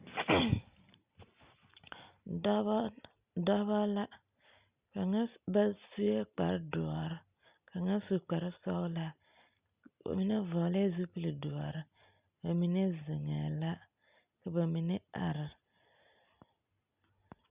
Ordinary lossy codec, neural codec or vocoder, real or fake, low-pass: Opus, 64 kbps; none; real; 3.6 kHz